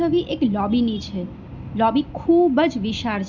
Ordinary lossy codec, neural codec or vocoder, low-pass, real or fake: none; none; 7.2 kHz; real